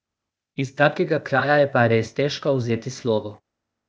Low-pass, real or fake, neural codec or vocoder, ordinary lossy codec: none; fake; codec, 16 kHz, 0.8 kbps, ZipCodec; none